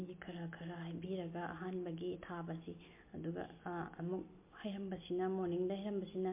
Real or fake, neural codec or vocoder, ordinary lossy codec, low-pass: real; none; MP3, 24 kbps; 3.6 kHz